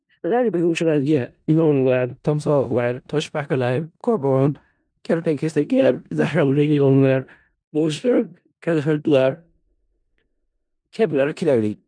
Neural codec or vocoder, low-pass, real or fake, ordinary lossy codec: codec, 16 kHz in and 24 kHz out, 0.4 kbps, LongCat-Audio-Codec, four codebook decoder; 9.9 kHz; fake; none